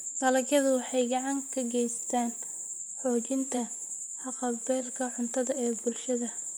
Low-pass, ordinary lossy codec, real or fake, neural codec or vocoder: none; none; fake; vocoder, 44.1 kHz, 128 mel bands, Pupu-Vocoder